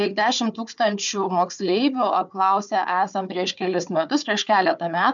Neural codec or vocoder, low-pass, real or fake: codec, 16 kHz, 4 kbps, FunCodec, trained on Chinese and English, 50 frames a second; 7.2 kHz; fake